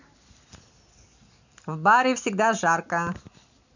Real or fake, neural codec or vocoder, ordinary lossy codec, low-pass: real; none; none; 7.2 kHz